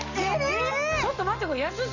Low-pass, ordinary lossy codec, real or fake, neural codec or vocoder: 7.2 kHz; none; real; none